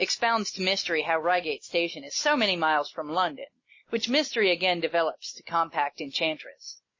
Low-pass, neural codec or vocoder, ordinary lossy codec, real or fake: 7.2 kHz; none; MP3, 32 kbps; real